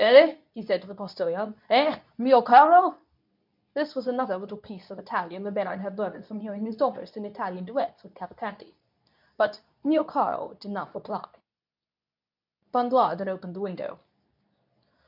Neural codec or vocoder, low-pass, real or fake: codec, 24 kHz, 0.9 kbps, WavTokenizer, medium speech release version 2; 5.4 kHz; fake